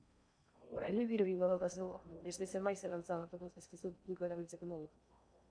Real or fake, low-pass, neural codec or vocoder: fake; 9.9 kHz; codec, 16 kHz in and 24 kHz out, 0.6 kbps, FocalCodec, streaming, 4096 codes